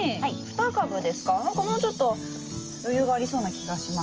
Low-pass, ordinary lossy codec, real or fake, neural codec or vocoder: 7.2 kHz; Opus, 24 kbps; real; none